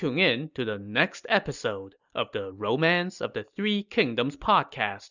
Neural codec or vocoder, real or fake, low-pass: none; real; 7.2 kHz